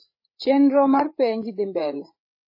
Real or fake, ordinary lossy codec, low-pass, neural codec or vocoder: fake; MP3, 24 kbps; 5.4 kHz; codec, 16 kHz, 8 kbps, FreqCodec, larger model